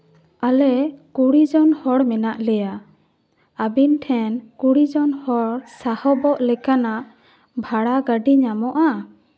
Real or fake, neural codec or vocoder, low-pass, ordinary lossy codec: real; none; none; none